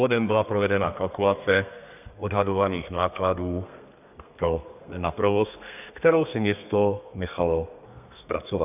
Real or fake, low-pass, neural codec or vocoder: fake; 3.6 kHz; codec, 32 kHz, 1.9 kbps, SNAC